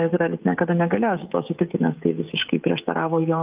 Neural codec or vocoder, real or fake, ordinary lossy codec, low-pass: codec, 16 kHz, 16 kbps, FreqCodec, smaller model; fake; Opus, 24 kbps; 3.6 kHz